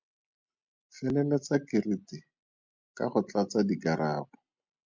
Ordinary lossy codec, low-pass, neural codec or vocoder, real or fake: MP3, 64 kbps; 7.2 kHz; none; real